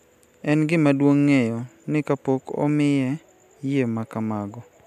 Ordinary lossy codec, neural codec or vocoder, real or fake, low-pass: none; none; real; 14.4 kHz